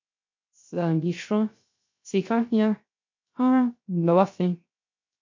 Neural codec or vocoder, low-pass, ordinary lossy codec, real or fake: codec, 16 kHz, 0.3 kbps, FocalCodec; 7.2 kHz; MP3, 48 kbps; fake